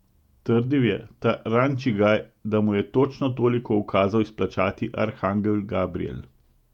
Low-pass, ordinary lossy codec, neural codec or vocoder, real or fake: 19.8 kHz; none; vocoder, 48 kHz, 128 mel bands, Vocos; fake